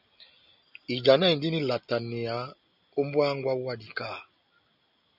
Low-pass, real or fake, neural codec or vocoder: 5.4 kHz; real; none